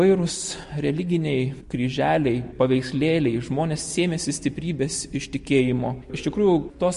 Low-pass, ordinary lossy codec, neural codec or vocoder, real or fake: 14.4 kHz; MP3, 48 kbps; none; real